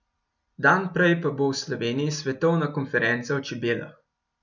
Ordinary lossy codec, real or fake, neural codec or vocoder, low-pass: none; real; none; 7.2 kHz